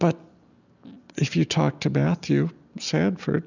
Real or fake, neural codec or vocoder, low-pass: real; none; 7.2 kHz